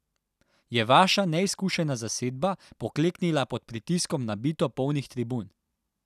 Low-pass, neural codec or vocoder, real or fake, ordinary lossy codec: 14.4 kHz; none; real; none